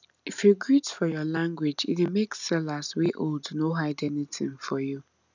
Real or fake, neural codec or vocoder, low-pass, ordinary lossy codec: real; none; 7.2 kHz; none